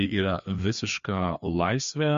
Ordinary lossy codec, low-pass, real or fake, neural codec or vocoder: MP3, 48 kbps; 7.2 kHz; fake; codec, 16 kHz, 2 kbps, FreqCodec, larger model